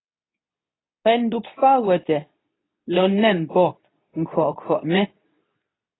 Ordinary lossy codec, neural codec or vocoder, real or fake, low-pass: AAC, 16 kbps; codec, 24 kHz, 0.9 kbps, WavTokenizer, medium speech release version 2; fake; 7.2 kHz